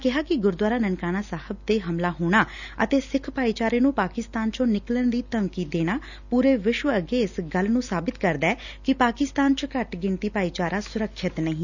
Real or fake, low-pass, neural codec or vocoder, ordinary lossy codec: real; 7.2 kHz; none; none